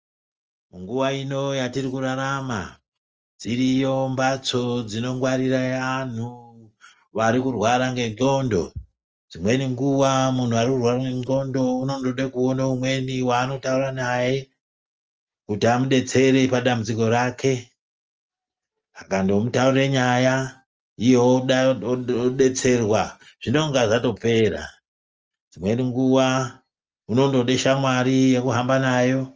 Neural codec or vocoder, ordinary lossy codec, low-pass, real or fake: none; Opus, 24 kbps; 7.2 kHz; real